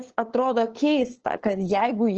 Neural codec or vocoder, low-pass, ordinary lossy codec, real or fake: codec, 16 kHz, 2 kbps, FunCodec, trained on LibriTTS, 25 frames a second; 7.2 kHz; Opus, 16 kbps; fake